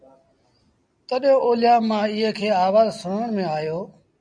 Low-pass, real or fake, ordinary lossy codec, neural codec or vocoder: 9.9 kHz; real; MP3, 96 kbps; none